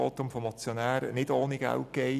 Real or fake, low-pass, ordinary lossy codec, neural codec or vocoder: real; 14.4 kHz; none; none